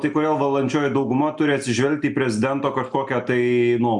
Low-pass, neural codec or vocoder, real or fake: 10.8 kHz; none; real